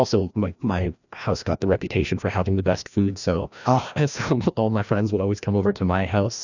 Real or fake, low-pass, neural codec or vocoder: fake; 7.2 kHz; codec, 16 kHz, 1 kbps, FreqCodec, larger model